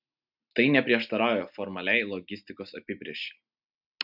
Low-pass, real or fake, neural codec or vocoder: 5.4 kHz; real; none